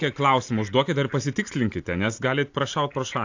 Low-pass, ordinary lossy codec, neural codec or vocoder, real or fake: 7.2 kHz; AAC, 48 kbps; none; real